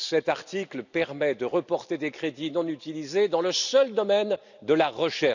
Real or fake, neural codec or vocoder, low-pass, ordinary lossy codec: real; none; 7.2 kHz; none